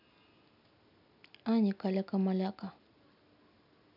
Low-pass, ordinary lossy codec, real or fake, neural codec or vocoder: 5.4 kHz; none; real; none